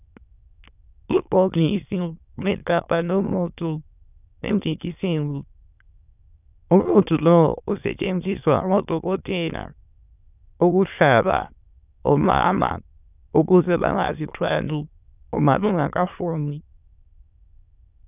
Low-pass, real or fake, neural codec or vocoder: 3.6 kHz; fake; autoencoder, 22.05 kHz, a latent of 192 numbers a frame, VITS, trained on many speakers